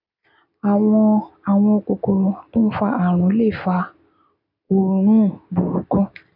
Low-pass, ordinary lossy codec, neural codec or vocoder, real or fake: 5.4 kHz; none; none; real